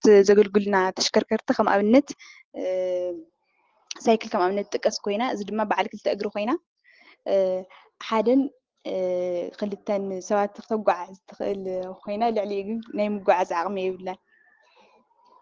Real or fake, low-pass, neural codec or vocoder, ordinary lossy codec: real; 7.2 kHz; none; Opus, 16 kbps